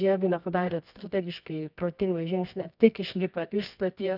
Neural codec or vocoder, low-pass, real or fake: codec, 24 kHz, 0.9 kbps, WavTokenizer, medium music audio release; 5.4 kHz; fake